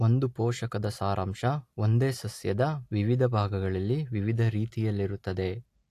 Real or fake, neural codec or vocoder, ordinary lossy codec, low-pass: real; none; AAC, 64 kbps; 14.4 kHz